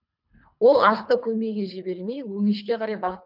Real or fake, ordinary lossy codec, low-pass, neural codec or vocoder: fake; none; 5.4 kHz; codec, 24 kHz, 3 kbps, HILCodec